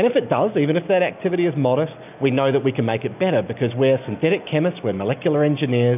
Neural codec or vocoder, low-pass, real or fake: none; 3.6 kHz; real